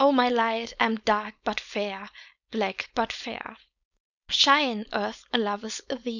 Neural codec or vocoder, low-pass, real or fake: codec, 16 kHz, 4.8 kbps, FACodec; 7.2 kHz; fake